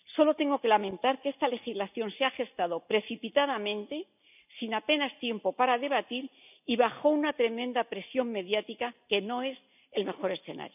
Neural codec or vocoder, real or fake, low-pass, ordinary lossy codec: none; real; 3.6 kHz; none